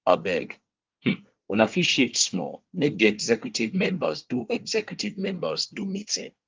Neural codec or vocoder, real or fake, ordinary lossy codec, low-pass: codec, 24 kHz, 1 kbps, SNAC; fake; Opus, 24 kbps; 7.2 kHz